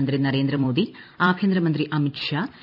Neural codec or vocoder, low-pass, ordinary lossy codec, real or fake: vocoder, 44.1 kHz, 128 mel bands every 256 samples, BigVGAN v2; 5.4 kHz; none; fake